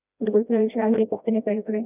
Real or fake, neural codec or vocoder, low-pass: fake; codec, 16 kHz, 1 kbps, FreqCodec, smaller model; 3.6 kHz